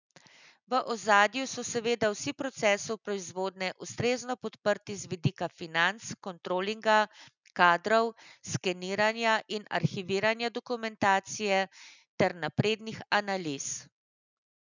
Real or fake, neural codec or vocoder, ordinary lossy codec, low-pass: real; none; none; 7.2 kHz